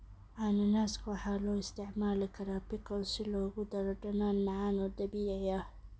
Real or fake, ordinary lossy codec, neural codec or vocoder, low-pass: real; none; none; none